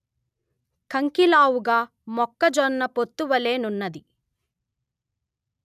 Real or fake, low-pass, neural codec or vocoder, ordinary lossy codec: real; 14.4 kHz; none; none